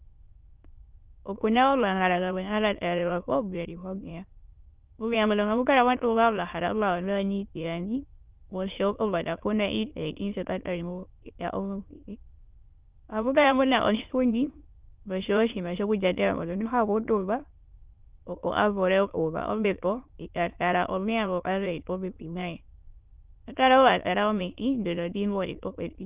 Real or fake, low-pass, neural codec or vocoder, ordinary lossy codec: fake; 3.6 kHz; autoencoder, 22.05 kHz, a latent of 192 numbers a frame, VITS, trained on many speakers; Opus, 32 kbps